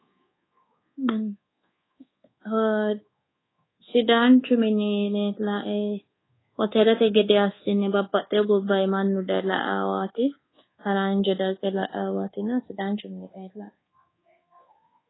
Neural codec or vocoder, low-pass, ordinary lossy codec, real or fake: codec, 24 kHz, 1.2 kbps, DualCodec; 7.2 kHz; AAC, 16 kbps; fake